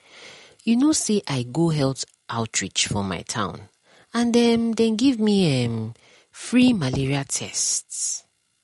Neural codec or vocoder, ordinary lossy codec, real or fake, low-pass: none; MP3, 48 kbps; real; 19.8 kHz